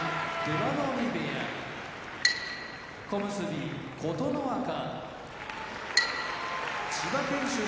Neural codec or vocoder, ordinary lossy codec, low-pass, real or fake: none; none; none; real